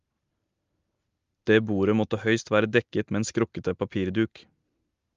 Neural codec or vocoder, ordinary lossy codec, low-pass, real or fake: none; Opus, 32 kbps; 7.2 kHz; real